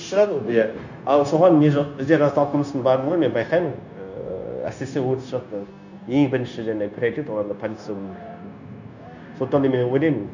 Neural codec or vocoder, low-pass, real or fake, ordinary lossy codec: codec, 16 kHz, 0.9 kbps, LongCat-Audio-Codec; 7.2 kHz; fake; none